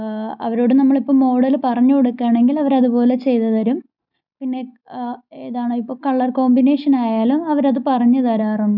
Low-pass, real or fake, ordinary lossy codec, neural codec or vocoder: 5.4 kHz; real; none; none